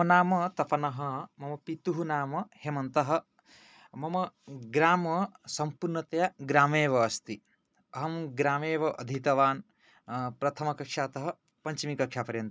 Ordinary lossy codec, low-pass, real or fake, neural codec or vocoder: none; none; real; none